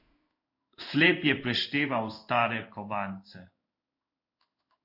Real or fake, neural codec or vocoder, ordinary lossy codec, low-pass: fake; codec, 16 kHz in and 24 kHz out, 1 kbps, XY-Tokenizer; AAC, 48 kbps; 5.4 kHz